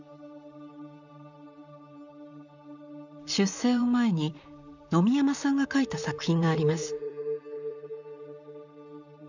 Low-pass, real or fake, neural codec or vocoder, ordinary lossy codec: 7.2 kHz; fake; vocoder, 44.1 kHz, 128 mel bands, Pupu-Vocoder; none